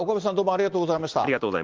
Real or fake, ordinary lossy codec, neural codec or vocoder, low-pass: real; Opus, 16 kbps; none; 7.2 kHz